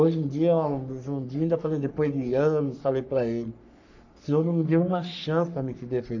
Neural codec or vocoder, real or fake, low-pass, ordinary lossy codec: codec, 44.1 kHz, 3.4 kbps, Pupu-Codec; fake; 7.2 kHz; none